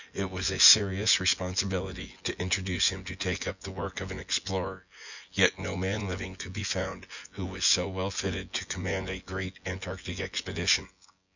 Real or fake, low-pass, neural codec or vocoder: fake; 7.2 kHz; vocoder, 24 kHz, 100 mel bands, Vocos